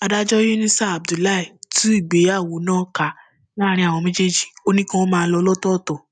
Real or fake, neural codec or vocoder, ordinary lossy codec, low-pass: real; none; none; 9.9 kHz